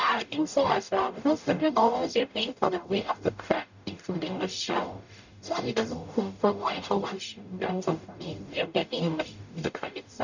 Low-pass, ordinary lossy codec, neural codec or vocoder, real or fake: 7.2 kHz; none; codec, 44.1 kHz, 0.9 kbps, DAC; fake